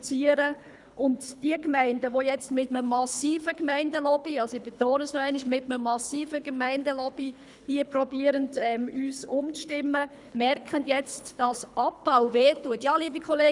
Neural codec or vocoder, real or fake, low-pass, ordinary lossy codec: codec, 24 kHz, 3 kbps, HILCodec; fake; 10.8 kHz; none